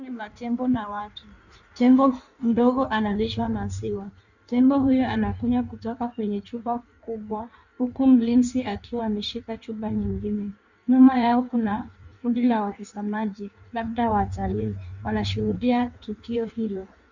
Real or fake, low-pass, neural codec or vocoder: fake; 7.2 kHz; codec, 16 kHz in and 24 kHz out, 1.1 kbps, FireRedTTS-2 codec